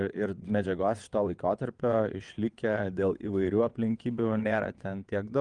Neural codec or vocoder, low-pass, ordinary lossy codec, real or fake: vocoder, 22.05 kHz, 80 mel bands, WaveNeXt; 9.9 kHz; Opus, 24 kbps; fake